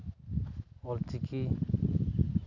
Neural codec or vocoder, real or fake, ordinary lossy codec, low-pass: none; real; none; 7.2 kHz